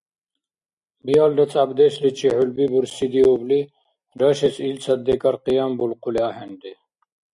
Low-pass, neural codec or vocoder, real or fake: 10.8 kHz; none; real